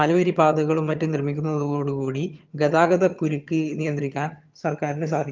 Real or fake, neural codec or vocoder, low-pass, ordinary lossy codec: fake; vocoder, 22.05 kHz, 80 mel bands, HiFi-GAN; 7.2 kHz; Opus, 24 kbps